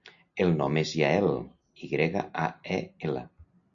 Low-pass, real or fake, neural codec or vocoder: 7.2 kHz; real; none